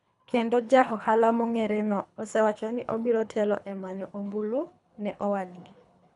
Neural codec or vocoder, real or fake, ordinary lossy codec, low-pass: codec, 24 kHz, 3 kbps, HILCodec; fake; none; 10.8 kHz